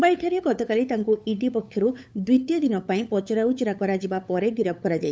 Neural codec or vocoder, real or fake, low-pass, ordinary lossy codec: codec, 16 kHz, 8 kbps, FunCodec, trained on LibriTTS, 25 frames a second; fake; none; none